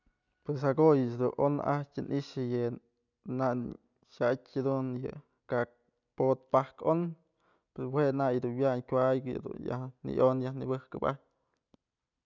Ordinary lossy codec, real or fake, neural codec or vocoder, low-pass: none; real; none; 7.2 kHz